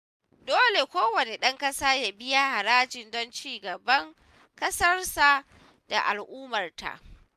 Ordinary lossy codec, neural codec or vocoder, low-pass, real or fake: MP3, 96 kbps; none; 14.4 kHz; real